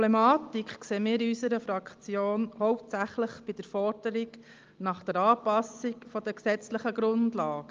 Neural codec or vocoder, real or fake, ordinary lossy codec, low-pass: none; real; Opus, 32 kbps; 7.2 kHz